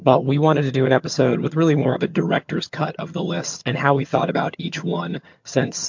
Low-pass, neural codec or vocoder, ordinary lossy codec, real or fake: 7.2 kHz; vocoder, 22.05 kHz, 80 mel bands, HiFi-GAN; MP3, 48 kbps; fake